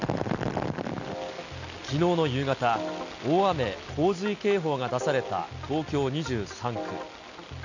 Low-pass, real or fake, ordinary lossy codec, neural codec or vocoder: 7.2 kHz; real; none; none